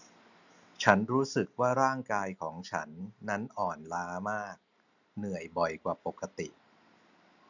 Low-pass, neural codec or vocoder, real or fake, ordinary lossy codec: 7.2 kHz; none; real; none